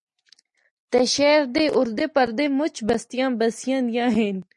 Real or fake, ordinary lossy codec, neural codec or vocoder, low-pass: real; MP3, 48 kbps; none; 10.8 kHz